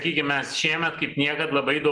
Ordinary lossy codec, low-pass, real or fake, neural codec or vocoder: Opus, 24 kbps; 10.8 kHz; real; none